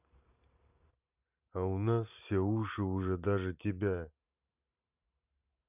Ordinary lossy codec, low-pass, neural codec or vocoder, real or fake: none; 3.6 kHz; vocoder, 44.1 kHz, 128 mel bands every 512 samples, BigVGAN v2; fake